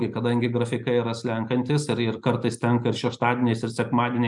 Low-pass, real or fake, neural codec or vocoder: 10.8 kHz; real; none